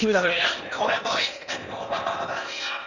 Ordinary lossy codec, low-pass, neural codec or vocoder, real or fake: none; 7.2 kHz; codec, 16 kHz in and 24 kHz out, 0.8 kbps, FocalCodec, streaming, 65536 codes; fake